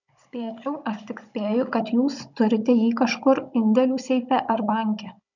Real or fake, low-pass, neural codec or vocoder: fake; 7.2 kHz; codec, 16 kHz, 16 kbps, FunCodec, trained on Chinese and English, 50 frames a second